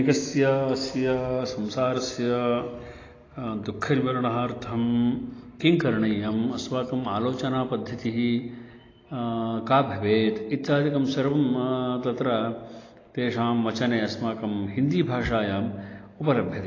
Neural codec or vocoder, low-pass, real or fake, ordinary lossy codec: none; 7.2 kHz; real; AAC, 32 kbps